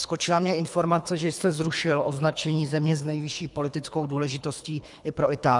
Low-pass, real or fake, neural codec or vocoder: 10.8 kHz; fake; codec, 24 kHz, 3 kbps, HILCodec